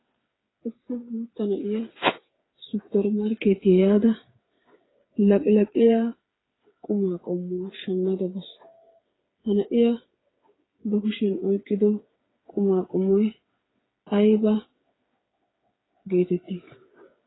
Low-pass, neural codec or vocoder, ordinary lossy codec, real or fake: 7.2 kHz; codec, 16 kHz, 8 kbps, FreqCodec, smaller model; AAC, 16 kbps; fake